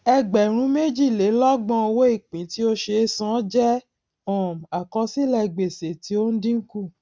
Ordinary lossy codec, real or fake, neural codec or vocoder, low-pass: none; real; none; none